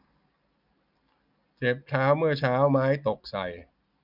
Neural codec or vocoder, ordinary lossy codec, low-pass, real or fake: none; none; 5.4 kHz; real